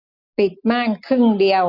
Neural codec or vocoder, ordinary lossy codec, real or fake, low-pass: none; none; real; 5.4 kHz